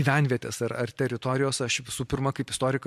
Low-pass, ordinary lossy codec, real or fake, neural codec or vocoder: 14.4 kHz; MP3, 64 kbps; fake; vocoder, 44.1 kHz, 128 mel bands every 256 samples, BigVGAN v2